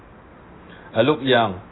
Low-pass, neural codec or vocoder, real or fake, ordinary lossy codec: 7.2 kHz; none; real; AAC, 16 kbps